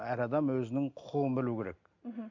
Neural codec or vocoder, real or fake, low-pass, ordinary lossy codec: none; real; 7.2 kHz; MP3, 64 kbps